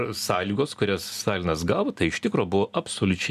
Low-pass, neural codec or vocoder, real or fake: 14.4 kHz; none; real